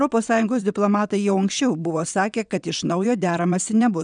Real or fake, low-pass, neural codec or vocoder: fake; 9.9 kHz; vocoder, 22.05 kHz, 80 mel bands, WaveNeXt